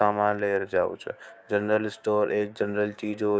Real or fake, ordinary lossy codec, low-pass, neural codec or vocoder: fake; none; none; codec, 16 kHz, 6 kbps, DAC